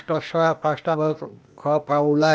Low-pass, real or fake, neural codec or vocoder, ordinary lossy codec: none; fake; codec, 16 kHz, 0.8 kbps, ZipCodec; none